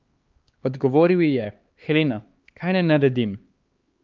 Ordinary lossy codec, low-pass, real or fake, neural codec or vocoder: Opus, 32 kbps; 7.2 kHz; fake; codec, 16 kHz, 4 kbps, X-Codec, HuBERT features, trained on LibriSpeech